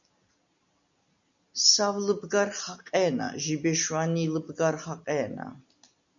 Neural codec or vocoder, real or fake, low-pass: none; real; 7.2 kHz